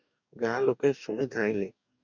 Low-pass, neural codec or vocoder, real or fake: 7.2 kHz; codec, 44.1 kHz, 2.6 kbps, DAC; fake